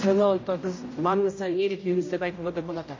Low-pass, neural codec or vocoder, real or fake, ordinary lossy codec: 7.2 kHz; codec, 16 kHz, 0.5 kbps, X-Codec, HuBERT features, trained on general audio; fake; MP3, 32 kbps